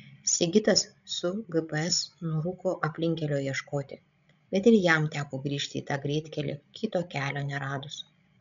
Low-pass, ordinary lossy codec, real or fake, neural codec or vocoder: 7.2 kHz; MP3, 96 kbps; fake; codec, 16 kHz, 16 kbps, FreqCodec, larger model